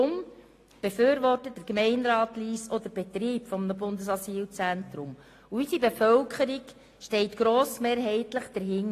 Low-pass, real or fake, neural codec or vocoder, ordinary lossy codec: 14.4 kHz; real; none; AAC, 48 kbps